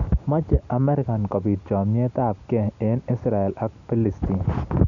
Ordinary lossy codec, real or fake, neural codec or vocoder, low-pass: none; real; none; 7.2 kHz